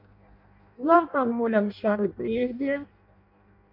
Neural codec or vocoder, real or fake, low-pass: codec, 16 kHz in and 24 kHz out, 0.6 kbps, FireRedTTS-2 codec; fake; 5.4 kHz